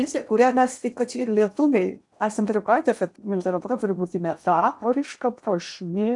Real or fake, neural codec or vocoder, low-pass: fake; codec, 16 kHz in and 24 kHz out, 0.8 kbps, FocalCodec, streaming, 65536 codes; 10.8 kHz